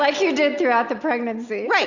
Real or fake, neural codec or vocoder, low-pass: real; none; 7.2 kHz